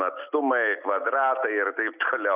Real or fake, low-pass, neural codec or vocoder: real; 3.6 kHz; none